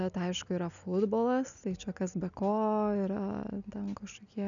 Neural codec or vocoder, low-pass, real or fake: none; 7.2 kHz; real